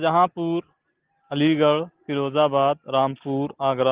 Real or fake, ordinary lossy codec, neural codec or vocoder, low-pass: real; Opus, 16 kbps; none; 3.6 kHz